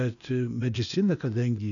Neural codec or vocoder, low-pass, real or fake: codec, 16 kHz, 0.8 kbps, ZipCodec; 7.2 kHz; fake